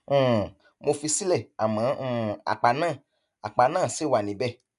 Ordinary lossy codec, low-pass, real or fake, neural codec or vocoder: none; 10.8 kHz; real; none